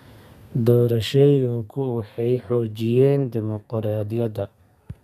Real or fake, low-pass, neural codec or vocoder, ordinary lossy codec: fake; 14.4 kHz; codec, 32 kHz, 1.9 kbps, SNAC; none